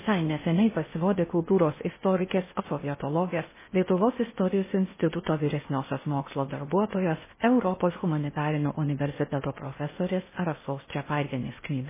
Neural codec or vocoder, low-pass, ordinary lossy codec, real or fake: codec, 16 kHz in and 24 kHz out, 0.6 kbps, FocalCodec, streaming, 4096 codes; 3.6 kHz; MP3, 16 kbps; fake